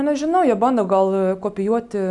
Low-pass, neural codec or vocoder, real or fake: 10.8 kHz; none; real